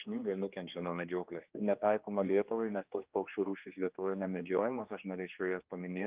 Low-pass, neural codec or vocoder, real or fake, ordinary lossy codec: 3.6 kHz; codec, 16 kHz, 2 kbps, X-Codec, HuBERT features, trained on general audio; fake; Opus, 24 kbps